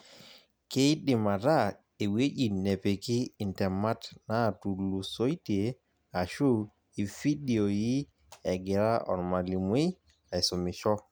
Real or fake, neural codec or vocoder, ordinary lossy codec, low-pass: real; none; none; none